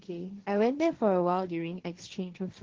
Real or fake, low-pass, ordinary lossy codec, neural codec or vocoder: fake; 7.2 kHz; Opus, 16 kbps; codec, 16 kHz, 1.1 kbps, Voila-Tokenizer